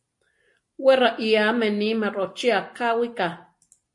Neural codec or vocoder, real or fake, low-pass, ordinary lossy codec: none; real; 10.8 kHz; MP3, 48 kbps